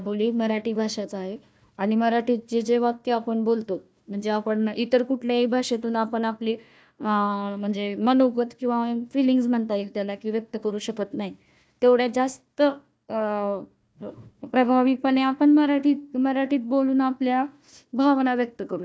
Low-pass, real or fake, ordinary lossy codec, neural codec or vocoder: none; fake; none; codec, 16 kHz, 1 kbps, FunCodec, trained on Chinese and English, 50 frames a second